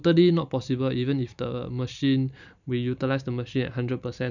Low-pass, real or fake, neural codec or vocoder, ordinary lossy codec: 7.2 kHz; real; none; none